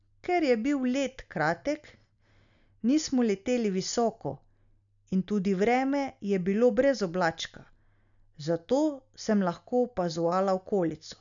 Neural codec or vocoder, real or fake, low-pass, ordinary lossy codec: none; real; 7.2 kHz; none